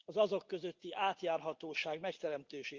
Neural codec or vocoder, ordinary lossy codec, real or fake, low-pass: none; Opus, 32 kbps; real; 7.2 kHz